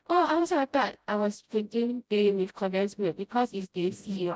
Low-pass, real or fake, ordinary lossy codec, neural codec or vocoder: none; fake; none; codec, 16 kHz, 0.5 kbps, FreqCodec, smaller model